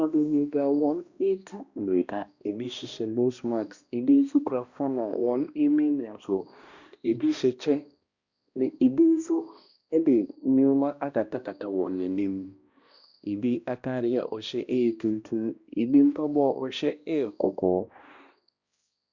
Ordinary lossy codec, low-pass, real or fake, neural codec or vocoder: Opus, 64 kbps; 7.2 kHz; fake; codec, 16 kHz, 1 kbps, X-Codec, HuBERT features, trained on balanced general audio